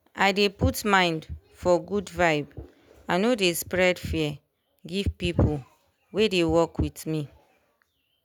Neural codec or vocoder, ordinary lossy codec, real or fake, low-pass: none; none; real; none